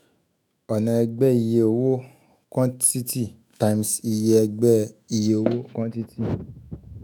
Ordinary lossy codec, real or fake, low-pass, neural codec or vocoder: none; fake; none; autoencoder, 48 kHz, 128 numbers a frame, DAC-VAE, trained on Japanese speech